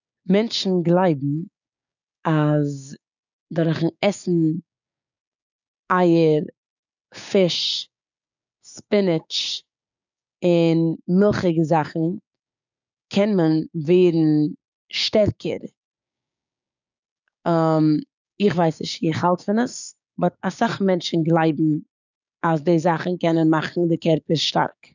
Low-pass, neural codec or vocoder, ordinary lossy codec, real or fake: 7.2 kHz; codec, 16 kHz, 6 kbps, DAC; none; fake